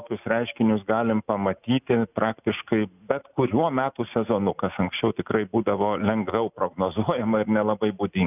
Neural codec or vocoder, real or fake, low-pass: none; real; 3.6 kHz